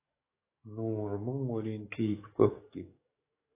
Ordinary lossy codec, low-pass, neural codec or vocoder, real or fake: AAC, 24 kbps; 3.6 kHz; codec, 44.1 kHz, 7.8 kbps, DAC; fake